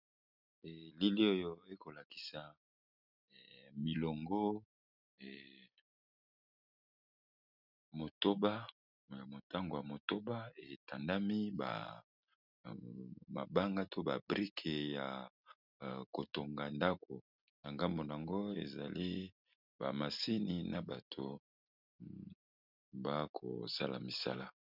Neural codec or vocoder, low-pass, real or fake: none; 5.4 kHz; real